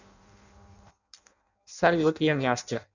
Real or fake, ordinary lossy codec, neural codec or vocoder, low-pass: fake; none; codec, 16 kHz in and 24 kHz out, 0.6 kbps, FireRedTTS-2 codec; 7.2 kHz